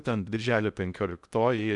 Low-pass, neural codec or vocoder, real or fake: 10.8 kHz; codec, 16 kHz in and 24 kHz out, 0.6 kbps, FocalCodec, streaming, 2048 codes; fake